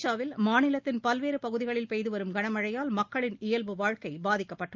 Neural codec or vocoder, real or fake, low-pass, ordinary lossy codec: none; real; 7.2 kHz; Opus, 24 kbps